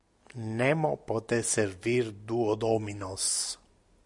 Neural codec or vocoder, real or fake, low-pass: none; real; 10.8 kHz